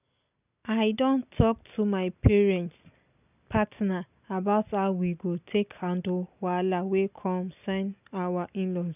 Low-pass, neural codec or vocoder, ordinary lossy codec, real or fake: 3.6 kHz; none; none; real